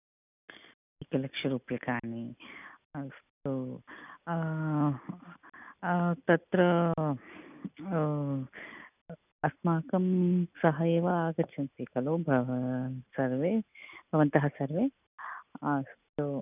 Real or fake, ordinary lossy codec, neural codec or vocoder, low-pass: real; none; none; 3.6 kHz